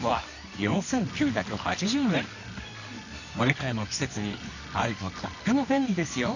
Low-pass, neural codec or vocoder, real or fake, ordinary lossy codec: 7.2 kHz; codec, 24 kHz, 0.9 kbps, WavTokenizer, medium music audio release; fake; none